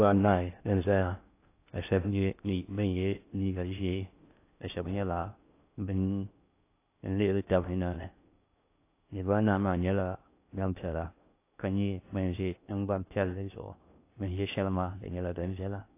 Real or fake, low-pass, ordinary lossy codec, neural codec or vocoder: fake; 3.6 kHz; AAC, 24 kbps; codec, 16 kHz in and 24 kHz out, 0.6 kbps, FocalCodec, streaming, 2048 codes